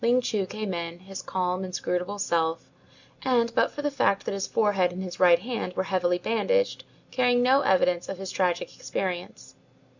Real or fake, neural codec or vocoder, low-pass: real; none; 7.2 kHz